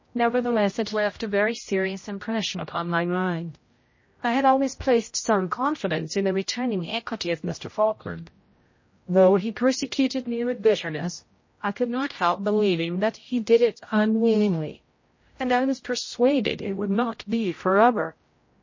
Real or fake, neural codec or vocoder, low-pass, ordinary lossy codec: fake; codec, 16 kHz, 0.5 kbps, X-Codec, HuBERT features, trained on general audio; 7.2 kHz; MP3, 32 kbps